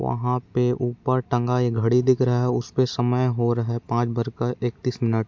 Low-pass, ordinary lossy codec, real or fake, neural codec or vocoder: 7.2 kHz; MP3, 64 kbps; real; none